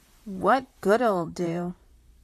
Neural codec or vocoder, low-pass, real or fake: vocoder, 44.1 kHz, 128 mel bands, Pupu-Vocoder; 14.4 kHz; fake